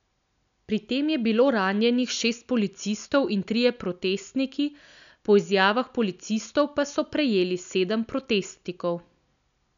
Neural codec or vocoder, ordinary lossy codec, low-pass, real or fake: none; none; 7.2 kHz; real